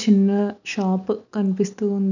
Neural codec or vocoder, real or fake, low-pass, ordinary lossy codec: none; real; 7.2 kHz; none